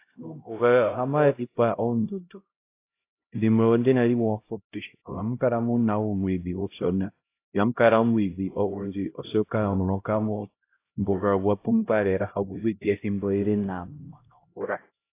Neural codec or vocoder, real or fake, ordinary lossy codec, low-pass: codec, 16 kHz, 0.5 kbps, X-Codec, HuBERT features, trained on LibriSpeech; fake; AAC, 24 kbps; 3.6 kHz